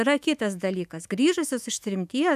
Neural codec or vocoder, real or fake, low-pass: autoencoder, 48 kHz, 128 numbers a frame, DAC-VAE, trained on Japanese speech; fake; 14.4 kHz